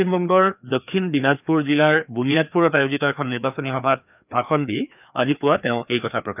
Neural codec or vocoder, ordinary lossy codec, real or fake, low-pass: codec, 16 kHz, 2 kbps, FreqCodec, larger model; none; fake; 3.6 kHz